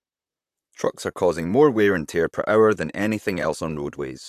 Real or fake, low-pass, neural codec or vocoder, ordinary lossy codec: fake; 14.4 kHz; vocoder, 44.1 kHz, 128 mel bands, Pupu-Vocoder; AAC, 96 kbps